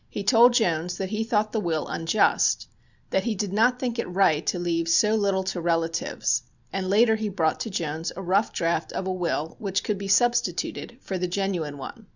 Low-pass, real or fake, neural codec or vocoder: 7.2 kHz; real; none